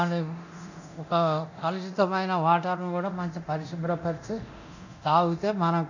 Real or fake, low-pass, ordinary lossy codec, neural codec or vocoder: fake; 7.2 kHz; none; codec, 24 kHz, 0.9 kbps, DualCodec